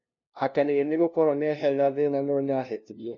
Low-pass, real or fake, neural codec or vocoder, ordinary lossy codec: 7.2 kHz; fake; codec, 16 kHz, 0.5 kbps, FunCodec, trained on LibriTTS, 25 frames a second; none